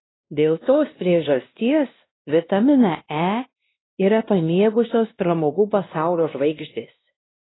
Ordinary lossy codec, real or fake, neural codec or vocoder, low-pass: AAC, 16 kbps; fake; codec, 16 kHz, 1 kbps, X-Codec, WavLM features, trained on Multilingual LibriSpeech; 7.2 kHz